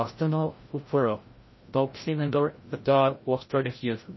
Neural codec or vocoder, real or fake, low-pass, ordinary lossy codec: codec, 16 kHz, 0.5 kbps, FreqCodec, larger model; fake; 7.2 kHz; MP3, 24 kbps